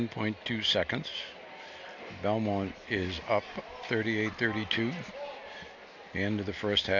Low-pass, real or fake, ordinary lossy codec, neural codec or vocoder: 7.2 kHz; real; AAC, 48 kbps; none